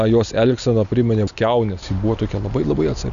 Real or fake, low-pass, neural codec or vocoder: real; 7.2 kHz; none